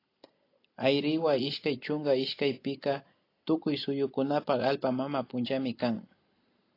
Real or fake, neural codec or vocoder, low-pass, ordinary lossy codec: real; none; 5.4 kHz; AAC, 32 kbps